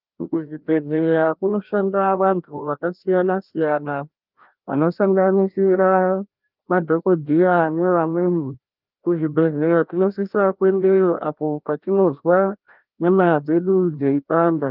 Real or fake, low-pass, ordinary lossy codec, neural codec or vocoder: fake; 5.4 kHz; Opus, 32 kbps; codec, 16 kHz, 1 kbps, FreqCodec, larger model